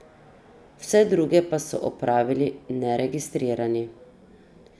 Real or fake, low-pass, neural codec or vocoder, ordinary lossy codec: real; none; none; none